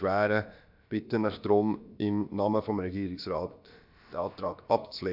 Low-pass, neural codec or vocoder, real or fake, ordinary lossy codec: 5.4 kHz; codec, 16 kHz, about 1 kbps, DyCAST, with the encoder's durations; fake; AAC, 48 kbps